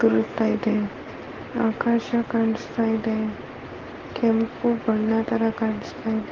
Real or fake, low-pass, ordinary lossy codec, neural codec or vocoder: real; 7.2 kHz; Opus, 16 kbps; none